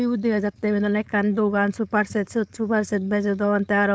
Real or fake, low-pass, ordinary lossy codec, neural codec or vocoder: fake; none; none; codec, 16 kHz, 4.8 kbps, FACodec